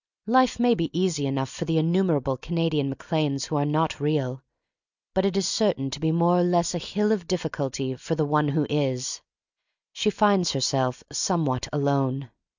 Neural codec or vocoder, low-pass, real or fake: none; 7.2 kHz; real